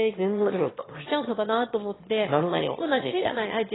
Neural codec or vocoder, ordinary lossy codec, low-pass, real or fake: autoencoder, 22.05 kHz, a latent of 192 numbers a frame, VITS, trained on one speaker; AAC, 16 kbps; 7.2 kHz; fake